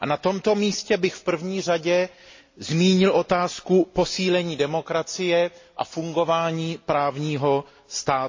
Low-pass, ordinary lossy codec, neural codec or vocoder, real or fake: 7.2 kHz; MP3, 32 kbps; none; real